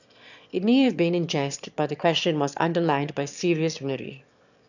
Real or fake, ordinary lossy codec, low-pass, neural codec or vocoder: fake; none; 7.2 kHz; autoencoder, 22.05 kHz, a latent of 192 numbers a frame, VITS, trained on one speaker